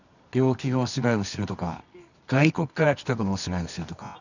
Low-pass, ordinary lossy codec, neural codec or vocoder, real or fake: 7.2 kHz; none; codec, 24 kHz, 0.9 kbps, WavTokenizer, medium music audio release; fake